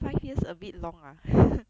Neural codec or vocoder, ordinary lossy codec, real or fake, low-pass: none; none; real; none